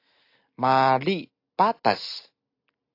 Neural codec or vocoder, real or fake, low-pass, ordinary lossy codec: none; real; 5.4 kHz; AAC, 32 kbps